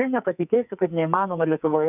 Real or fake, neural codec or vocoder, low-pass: fake; codec, 44.1 kHz, 2.6 kbps, SNAC; 3.6 kHz